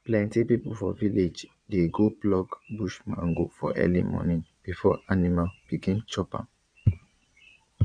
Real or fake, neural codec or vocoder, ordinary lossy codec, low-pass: fake; vocoder, 22.05 kHz, 80 mel bands, Vocos; none; 9.9 kHz